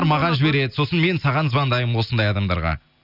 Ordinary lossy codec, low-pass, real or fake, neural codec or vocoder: none; 5.4 kHz; real; none